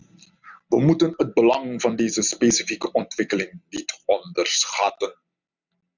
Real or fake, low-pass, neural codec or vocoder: real; 7.2 kHz; none